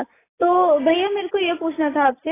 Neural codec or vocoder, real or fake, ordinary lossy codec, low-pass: none; real; AAC, 16 kbps; 3.6 kHz